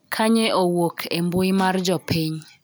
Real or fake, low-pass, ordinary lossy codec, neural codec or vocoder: real; none; none; none